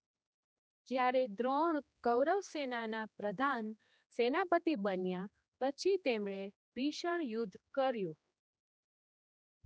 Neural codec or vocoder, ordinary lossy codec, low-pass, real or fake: codec, 16 kHz, 2 kbps, X-Codec, HuBERT features, trained on general audio; none; none; fake